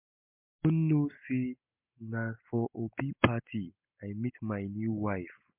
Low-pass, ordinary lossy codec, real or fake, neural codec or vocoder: 3.6 kHz; MP3, 32 kbps; real; none